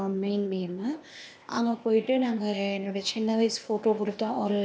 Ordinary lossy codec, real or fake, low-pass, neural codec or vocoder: none; fake; none; codec, 16 kHz, 0.8 kbps, ZipCodec